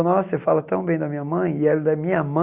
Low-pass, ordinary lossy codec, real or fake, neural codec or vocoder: 3.6 kHz; Opus, 64 kbps; real; none